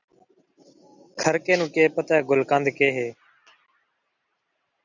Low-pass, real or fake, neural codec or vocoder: 7.2 kHz; real; none